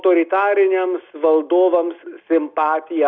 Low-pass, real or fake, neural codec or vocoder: 7.2 kHz; real; none